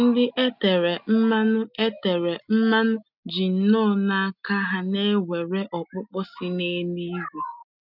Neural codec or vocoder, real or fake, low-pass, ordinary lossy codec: none; real; 5.4 kHz; none